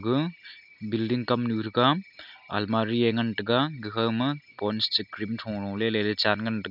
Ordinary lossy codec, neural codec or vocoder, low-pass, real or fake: none; none; 5.4 kHz; real